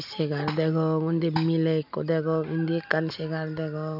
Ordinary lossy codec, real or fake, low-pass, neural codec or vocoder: none; real; 5.4 kHz; none